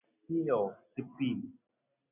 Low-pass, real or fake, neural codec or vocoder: 3.6 kHz; real; none